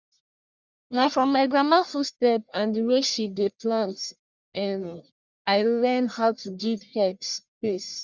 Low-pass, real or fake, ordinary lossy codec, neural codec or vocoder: 7.2 kHz; fake; Opus, 64 kbps; codec, 44.1 kHz, 1.7 kbps, Pupu-Codec